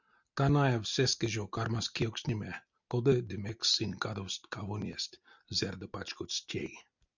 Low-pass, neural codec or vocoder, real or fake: 7.2 kHz; none; real